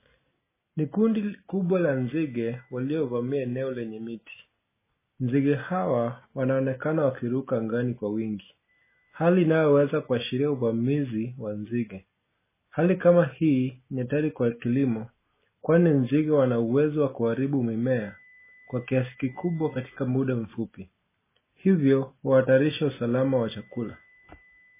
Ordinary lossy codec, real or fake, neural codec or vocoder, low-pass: MP3, 16 kbps; real; none; 3.6 kHz